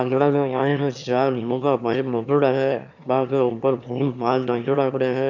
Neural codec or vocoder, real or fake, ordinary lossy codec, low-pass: autoencoder, 22.05 kHz, a latent of 192 numbers a frame, VITS, trained on one speaker; fake; none; 7.2 kHz